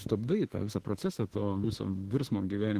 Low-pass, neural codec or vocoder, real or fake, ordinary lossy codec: 14.4 kHz; autoencoder, 48 kHz, 32 numbers a frame, DAC-VAE, trained on Japanese speech; fake; Opus, 16 kbps